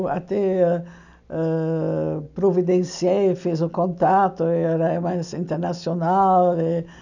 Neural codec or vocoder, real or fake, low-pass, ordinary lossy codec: none; real; 7.2 kHz; none